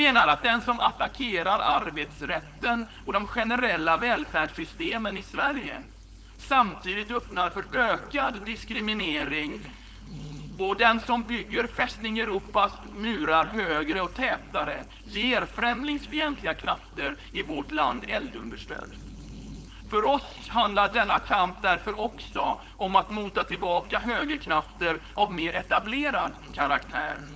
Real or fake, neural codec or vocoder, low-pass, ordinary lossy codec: fake; codec, 16 kHz, 4.8 kbps, FACodec; none; none